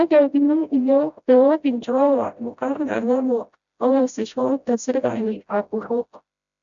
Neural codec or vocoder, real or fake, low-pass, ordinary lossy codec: codec, 16 kHz, 0.5 kbps, FreqCodec, smaller model; fake; 7.2 kHz; MP3, 96 kbps